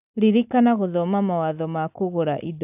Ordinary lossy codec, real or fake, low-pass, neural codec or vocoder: AAC, 32 kbps; real; 3.6 kHz; none